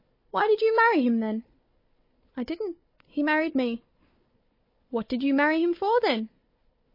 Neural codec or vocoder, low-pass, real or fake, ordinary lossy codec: codec, 16 kHz, 4 kbps, FunCodec, trained on Chinese and English, 50 frames a second; 5.4 kHz; fake; MP3, 24 kbps